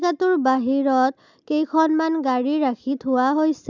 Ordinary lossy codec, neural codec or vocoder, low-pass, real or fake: none; none; 7.2 kHz; real